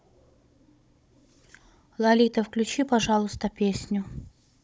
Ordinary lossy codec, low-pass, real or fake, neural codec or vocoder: none; none; fake; codec, 16 kHz, 16 kbps, FunCodec, trained on Chinese and English, 50 frames a second